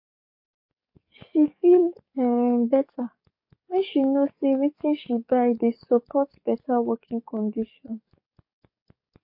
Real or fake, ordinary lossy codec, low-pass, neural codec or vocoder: fake; MP3, 32 kbps; 5.4 kHz; codec, 44.1 kHz, 7.8 kbps, DAC